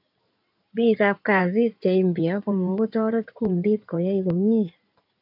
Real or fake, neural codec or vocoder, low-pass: fake; codec, 16 kHz in and 24 kHz out, 2.2 kbps, FireRedTTS-2 codec; 5.4 kHz